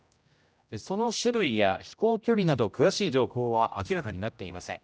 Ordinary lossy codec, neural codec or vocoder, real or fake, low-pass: none; codec, 16 kHz, 0.5 kbps, X-Codec, HuBERT features, trained on general audio; fake; none